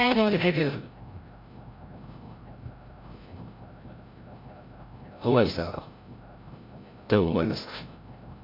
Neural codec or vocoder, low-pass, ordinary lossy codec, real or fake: codec, 16 kHz, 0.5 kbps, FreqCodec, larger model; 5.4 kHz; MP3, 48 kbps; fake